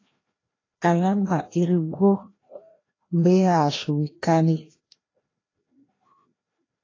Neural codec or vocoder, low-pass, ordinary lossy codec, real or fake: codec, 16 kHz, 1 kbps, FreqCodec, larger model; 7.2 kHz; AAC, 32 kbps; fake